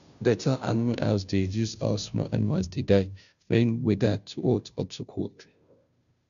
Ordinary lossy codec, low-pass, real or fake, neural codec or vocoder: none; 7.2 kHz; fake; codec, 16 kHz, 0.5 kbps, FunCodec, trained on Chinese and English, 25 frames a second